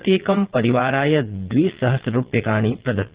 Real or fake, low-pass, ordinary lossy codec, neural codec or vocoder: fake; 3.6 kHz; Opus, 16 kbps; vocoder, 22.05 kHz, 80 mel bands, Vocos